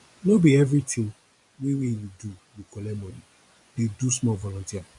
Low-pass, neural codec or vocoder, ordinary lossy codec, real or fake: 10.8 kHz; vocoder, 24 kHz, 100 mel bands, Vocos; MP3, 64 kbps; fake